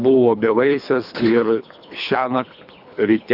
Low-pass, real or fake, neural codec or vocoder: 5.4 kHz; fake; codec, 24 kHz, 3 kbps, HILCodec